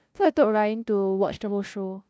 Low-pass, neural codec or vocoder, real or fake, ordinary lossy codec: none; codec, 16 kHz, 0.5 kbps, FunCodec, trained on LibriTTS, 25 frames a second; fake; none